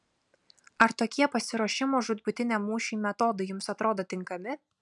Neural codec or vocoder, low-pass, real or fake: none; 10.8 kHz; real